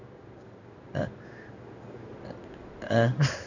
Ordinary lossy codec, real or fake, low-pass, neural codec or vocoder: none; fake; 7.2 kHz; codec, 16 kHz in and 24 kHz out, 1 kbps, XY-Tokenizer